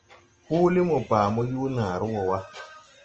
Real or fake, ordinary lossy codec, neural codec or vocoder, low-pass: real; Opus, 24 kbps; none; 7.2 kHz